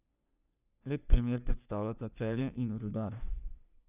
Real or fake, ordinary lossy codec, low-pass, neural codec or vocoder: fake; none; 3.6 kHz; codec, 44.1 kHz, 3.4 kbps, Pupu-Codec